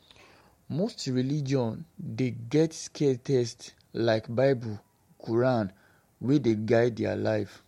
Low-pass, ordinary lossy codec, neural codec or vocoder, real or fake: 19.8 kHz; MP3, 64 kbps; none; real